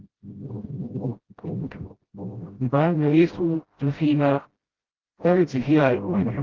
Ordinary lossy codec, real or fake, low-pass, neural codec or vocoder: Opus, 16 kbps; fake; 7.2 kHz; codec, 16 kHz, 0.5 kbps, FreqCodec, smaller model